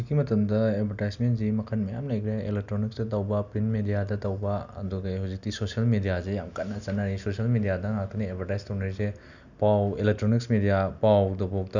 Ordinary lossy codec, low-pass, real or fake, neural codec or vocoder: none; 7.2 kHz; real; none